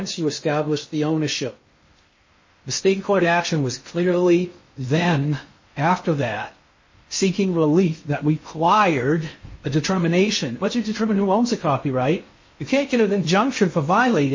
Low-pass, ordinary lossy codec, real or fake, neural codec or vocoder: 7.2 kHz; MP3, 32 kbps; fake; codec, 16 kHz in and 24 kHz out, 0.6 kbps, FocalCodec, streaming, 2048 codes